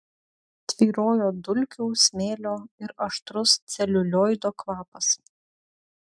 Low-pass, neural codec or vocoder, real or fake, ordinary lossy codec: 9.9 kHz; none; real; MP3, 96 kbps